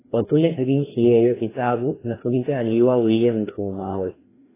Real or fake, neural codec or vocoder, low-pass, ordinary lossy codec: fake; codec, 16 kHz, 1 kbps, FreqCodec, larger model; 3.6 kHz; AAC, 16 kbps